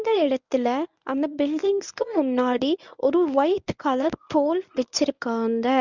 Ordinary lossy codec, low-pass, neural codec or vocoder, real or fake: none; 7.2 kHz; codec, 24 kHz, 0.9 kbps, WavTokenizer, medium speech release version 1; fake